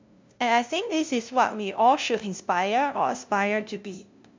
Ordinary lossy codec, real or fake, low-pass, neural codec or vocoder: MP3, 64 kbps; fake; 7.2 kHz; codec, 16 kHz, 0.5 kbps, FunCodec, trained on LibriTTS, 25 frames a second